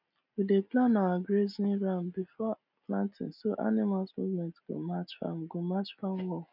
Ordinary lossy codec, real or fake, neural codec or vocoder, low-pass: none; real; none; 5.4 kHz